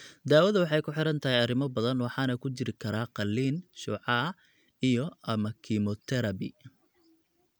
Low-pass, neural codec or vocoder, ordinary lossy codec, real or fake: none; none; none; real